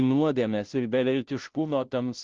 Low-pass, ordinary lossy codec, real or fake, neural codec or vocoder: 7.2 kHz; Opus, 16 kbps; fake; codec, 16 kHz, 0.5 kbps, FunCodec, trained on Chinese and English, 25 frames a second